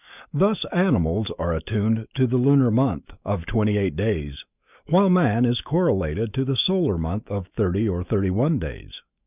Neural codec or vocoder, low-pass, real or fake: autoencoder, 48 kHz, 128 numbers a frame, DAC-VAE, trained on Japanese speech; 3.6 kHz; fake